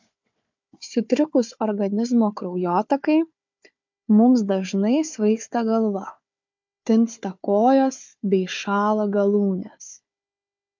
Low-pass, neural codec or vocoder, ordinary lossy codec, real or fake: 7.2 kHz; codec, 16 kHz, 4 kbps, FunCodec, trained on Chinese and English, 50 frames a second; MP3, 64 kbps; fake